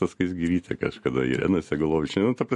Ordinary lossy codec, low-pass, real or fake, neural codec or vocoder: MP3, 48 kbps; 14.4 kHz; real; none